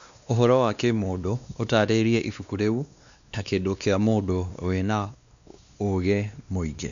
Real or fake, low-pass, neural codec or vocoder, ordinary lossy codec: fake; 7.2 kHz; codec, 16 kHz, 2 kbps, X-Codec, WavLM features, trained on Multilingual LibriSpeech; none